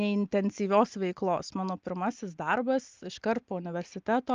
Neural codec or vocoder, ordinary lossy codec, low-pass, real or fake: none; Opus, 24 kbps; 7.2 kHz; real